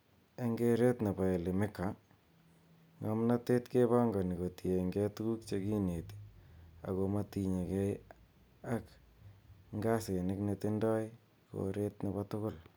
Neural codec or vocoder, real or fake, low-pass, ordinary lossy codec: none; real; none; none